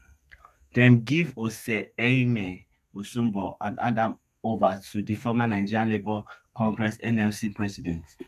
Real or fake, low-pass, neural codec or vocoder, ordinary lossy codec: fake; 14.4 kHz; codec, 32 kHz, 1.9 kbps, SNAC; none